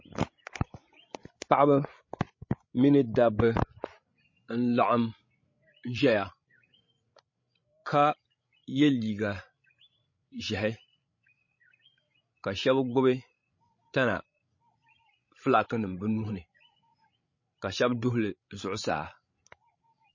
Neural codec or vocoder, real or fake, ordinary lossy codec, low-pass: codec, 16 kHz, 16 kbps, FreqCodec, larger model; fake; MP3, 32 kbps; 7.2 kHz